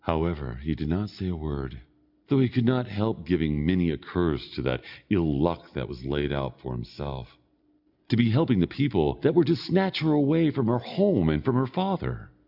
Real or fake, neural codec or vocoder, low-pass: real; none; 5.4 kHz